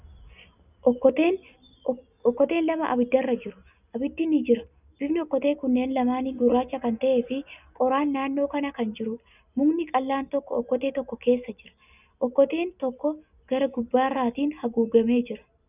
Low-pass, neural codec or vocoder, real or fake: 3.6 kHz; none; real